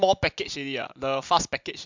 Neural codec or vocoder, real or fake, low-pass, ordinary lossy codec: none; real; 7.2 kHz; MP3, 64 kbps